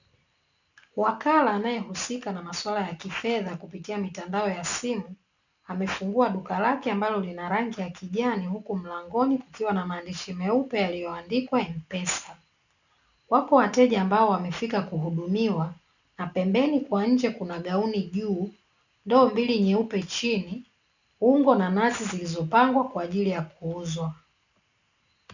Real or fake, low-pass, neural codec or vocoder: real; 7.2 kHz; none